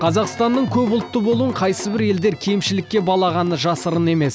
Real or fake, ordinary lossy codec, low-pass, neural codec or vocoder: real; none; none; none